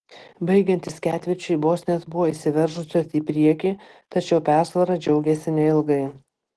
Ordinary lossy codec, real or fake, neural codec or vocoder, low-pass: Opus, 16 kbps; real; none; 10.8 kHz